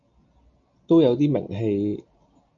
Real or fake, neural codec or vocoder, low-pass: real; none; 7.2 kHz